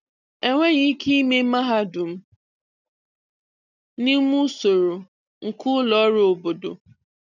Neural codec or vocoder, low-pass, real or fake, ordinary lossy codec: none; 7.2 kHz; real; none